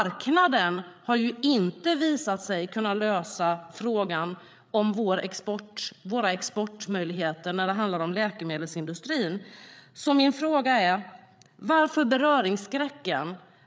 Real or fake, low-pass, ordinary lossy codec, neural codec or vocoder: fake; none; none; codec, 16 kHz, 8 kbps, FreqCodec, larger model